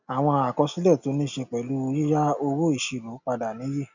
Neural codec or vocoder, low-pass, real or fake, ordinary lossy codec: none; 7.2 kHz; real; none